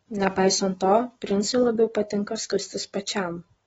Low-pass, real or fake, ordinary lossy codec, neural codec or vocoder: 19.8 kHz; real; AAC, 24 kbps; none